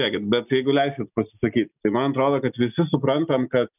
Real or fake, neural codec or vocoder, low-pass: real; none; 3.6 kHz